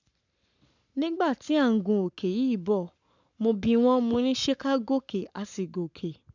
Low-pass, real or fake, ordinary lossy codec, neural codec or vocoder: 7.2 kHz; fake; none; codec, 44.1 kHz, 7.8 kbps, Pupu-Codec